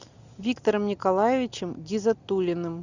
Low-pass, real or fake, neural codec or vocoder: 7.2 kHz; real; none